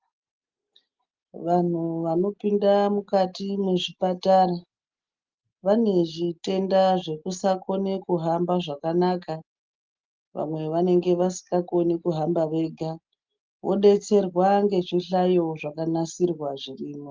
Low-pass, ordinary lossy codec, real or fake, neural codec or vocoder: 7.2 kHz; Opus, 32 kbps; real; none